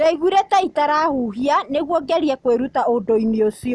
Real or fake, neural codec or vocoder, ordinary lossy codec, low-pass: real; none; none; none